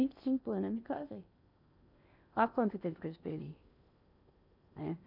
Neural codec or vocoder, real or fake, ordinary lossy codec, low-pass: codec, 16 kHz in and 24 kHz out, 0.8 kbps, FocalCodec, streaming, 65536 codes; fake; none; 5.4 kHz